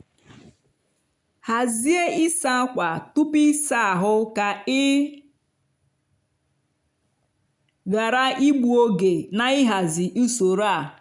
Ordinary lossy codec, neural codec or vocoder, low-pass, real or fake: none; none; 10.8 kHz; real